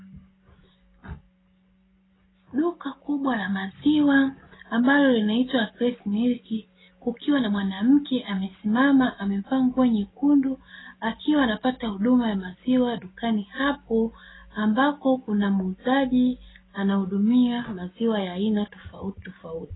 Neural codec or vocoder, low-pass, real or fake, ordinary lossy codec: none; 7.2 kHz; real; AAC, 16 kbps